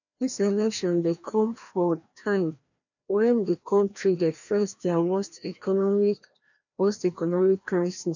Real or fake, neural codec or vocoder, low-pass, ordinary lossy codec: fake; codec, 16 kHz, 1 kbps, FreqCodec, larger model; 7.2 kHz; none